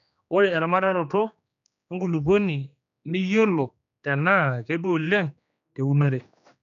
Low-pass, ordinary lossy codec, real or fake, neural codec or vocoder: 7.2 kHz; none; fake; codec, 16 kHz, 2 kbps, X-Codec, HuBERT features, trained on general audio